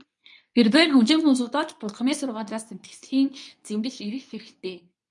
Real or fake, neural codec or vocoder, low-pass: fake; codec, 24 kHz, 0.9 kbps, WavTokenizer, medium speech release version 2; 10.8 kHz